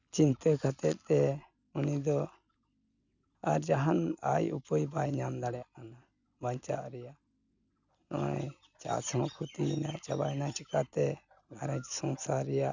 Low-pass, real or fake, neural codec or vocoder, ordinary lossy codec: 7.2 kHz; real; none; none